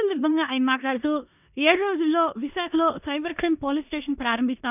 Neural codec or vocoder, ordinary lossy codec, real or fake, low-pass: codec, 16 kHz in and 24 kHz out, 0.9 kbps, LongCat-Audio-Codec, four codebook decoder; none; fake; 3.6 kHz